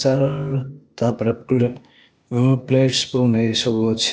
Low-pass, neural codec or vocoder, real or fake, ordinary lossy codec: none; codec, 16 kHz, 0.8 kbps, ZipCodec; fake; none